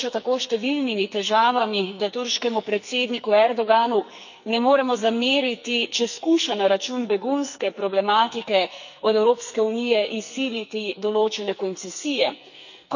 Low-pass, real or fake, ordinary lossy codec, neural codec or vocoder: 7.2 kHz; fake; none; codec, 44.1 kHz, 2.6 kbps, SNAC